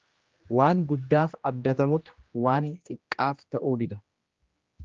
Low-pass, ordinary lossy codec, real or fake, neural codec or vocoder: 7.2 kHz; Opus, 32 kbps; fake; codec, 16 kHz, 1 kbps, X-Codec, HuBERT features, trained on general audio